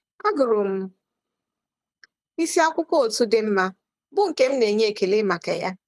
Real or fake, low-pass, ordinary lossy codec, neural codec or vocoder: fake; none; none; codec, 24 kHz, 6 kbps, HILCodec